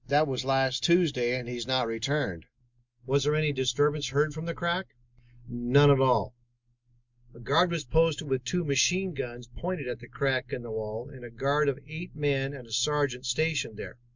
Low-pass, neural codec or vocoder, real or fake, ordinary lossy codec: 7.2 kHz; none; real; MP3, 64 kbps